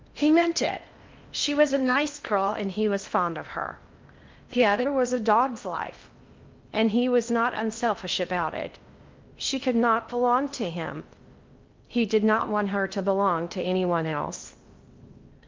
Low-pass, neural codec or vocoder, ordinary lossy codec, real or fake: 7.2 kHz; codec, 16 kHz in and 24 kHz out, 0.6 kbps, FocalCodec, streaming, 4096 codes; Opus, 32 kbps; fake